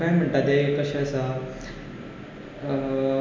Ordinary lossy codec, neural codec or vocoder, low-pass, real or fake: none; none; none; real